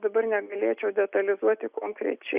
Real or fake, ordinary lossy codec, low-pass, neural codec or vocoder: real; Opus, 64 kbps; 3.6 kHz; none